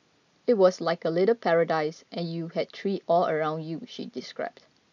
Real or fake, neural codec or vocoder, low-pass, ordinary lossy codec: real; none; 7.2 kHz; none